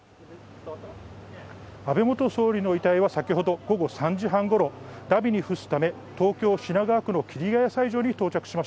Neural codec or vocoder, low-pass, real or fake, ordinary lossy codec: none; none; real; none